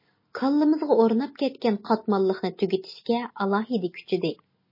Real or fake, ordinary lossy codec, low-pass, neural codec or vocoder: real; MP3, 24 kbps; 5.4 kHz; none